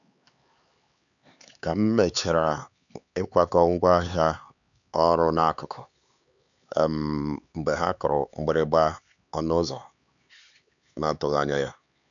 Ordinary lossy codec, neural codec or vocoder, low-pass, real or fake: none; codec, 16 kHz, 4 kbps, X-Codec, HuBERT features, trained on LibriSpeech; 7.2 kHz; fake